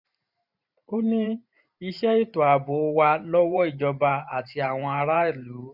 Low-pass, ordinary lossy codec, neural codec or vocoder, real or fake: 5.4 kHz; none; vocoder, 44.1 kHz, 128 mel bands every 512 samples, BigVGAN v2; fake